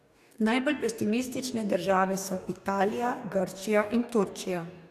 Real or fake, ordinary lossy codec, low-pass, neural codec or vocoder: fake; none; 14.4 kHz; codec, 44.1 kHz, 2.6 kbps, DAC